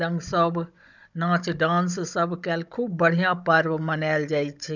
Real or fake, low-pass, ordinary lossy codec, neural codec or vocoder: fake; 7.2 kHz; none; codec, 16 kHz, 16 kbps, FunCodec, trained on Chinese and English, 50 frames a second